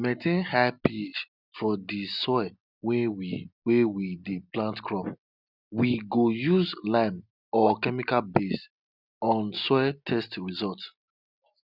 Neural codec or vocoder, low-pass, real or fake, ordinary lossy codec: none; 5.4 kHz; real; none